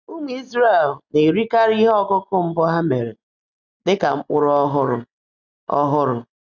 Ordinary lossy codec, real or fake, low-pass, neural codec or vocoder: none; real; 7.2 kHz; none